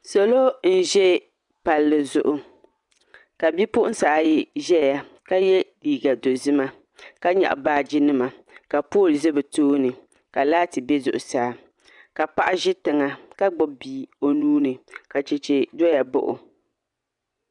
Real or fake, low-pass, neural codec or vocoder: fake; 10.8 kHz; vocoder, 24 kHz, 100 mel bands, Vocos